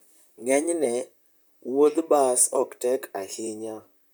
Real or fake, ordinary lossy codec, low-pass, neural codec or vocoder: fake; none; none; vocoder, 44.1 kHz, 128 mel bands, Pupu-Vocoder